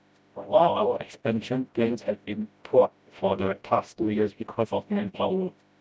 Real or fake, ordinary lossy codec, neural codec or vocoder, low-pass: fake; none; codec, 16 kHz, 0.5 kbps, FreqCodec, smaller model; none